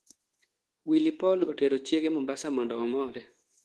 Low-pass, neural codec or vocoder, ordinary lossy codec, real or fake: 10.8 kHz; codec, 24 kHz, 1.2 kbps, DualCodec; Opus, 16 kbps; fake